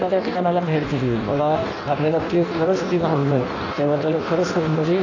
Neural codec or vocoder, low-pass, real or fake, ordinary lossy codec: codec, 16 kHz in and 24 kHz out, 0.6 kbps, FireRedTTS-2 codec; 7.2 kHz; fake; AAC, 48 kbps